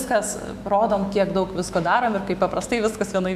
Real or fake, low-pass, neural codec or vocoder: fake; 14.4 kHz; autoencoder, 48 kHz, 128 numbers a frame, DAC-VAE, trained on Japanese speech